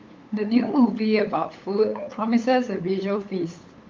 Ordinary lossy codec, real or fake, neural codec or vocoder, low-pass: Opus, 24 kbps; fake; codec, 16 kHz, 8 kbps, FunCodec, trained on LibriTTS, 25 frames a second; 7.2 kHz